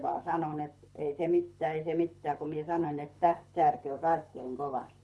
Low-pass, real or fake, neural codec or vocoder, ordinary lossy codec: none; fake; codec, 24 kHz, 6 kbps, HILCodec; none